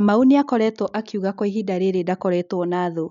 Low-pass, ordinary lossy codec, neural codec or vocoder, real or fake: 7.2 kHz; none; none; real